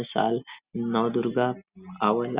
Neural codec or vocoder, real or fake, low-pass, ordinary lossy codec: none; real; 3.6 kHz; Opus, 64 kbps